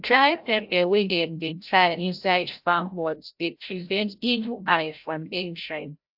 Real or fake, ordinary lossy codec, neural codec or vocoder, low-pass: fake; AAC, 48 kbps; codec, 16 kHz, 0.5 kbps, FreqCodec, larger model; 5.4 kHz